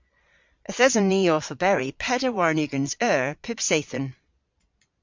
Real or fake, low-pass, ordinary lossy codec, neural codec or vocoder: fake; 7.2 kHz; MP3, 64 kbps; vocoder, 22.05 kHz, 80 mel bands, Vocos